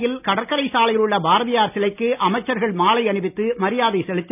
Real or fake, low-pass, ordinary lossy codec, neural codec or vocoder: real; 3.6 kHz; none; none